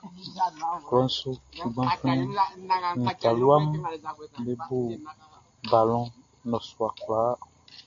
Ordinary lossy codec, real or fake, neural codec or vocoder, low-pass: AAC, 48 kbps; real; none; 7.2 kHz